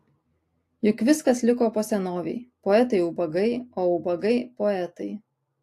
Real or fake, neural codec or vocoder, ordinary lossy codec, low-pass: real; none; AAC, 64 kbps; 14.4 kHz